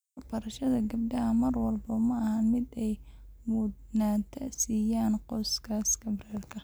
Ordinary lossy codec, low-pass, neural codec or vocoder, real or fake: none; none; none; real